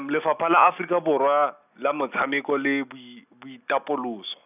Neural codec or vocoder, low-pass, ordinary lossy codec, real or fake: none; 3.6 kHz; none; real